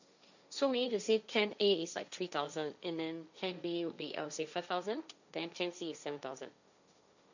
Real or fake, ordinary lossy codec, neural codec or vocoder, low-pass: fake; none; codec, 16 kHz, 1.1 kbps, Voila-Tokenizer; 7.2 kHz